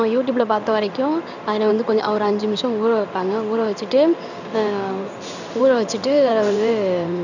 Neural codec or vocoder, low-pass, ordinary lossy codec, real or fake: codec, 16 kHz in and 24 kHz out, 1 kbps, XY-Tokenizer; 7.2 kHz; none; fake